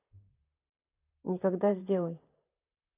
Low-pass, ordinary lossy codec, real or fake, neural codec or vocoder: 3.6 kHz; none; fake; vocoder, 44.1 kHz, 128 mel bands, Pupu-Vocoder